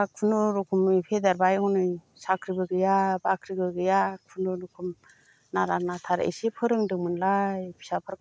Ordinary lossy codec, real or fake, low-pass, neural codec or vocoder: none; real; none; none